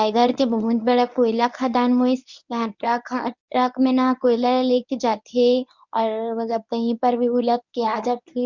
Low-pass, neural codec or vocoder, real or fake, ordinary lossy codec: 7.2 kHz; codec, 24 kHz, 0.9 kbps, WavTokenizer, medium speech release version 1; fake; none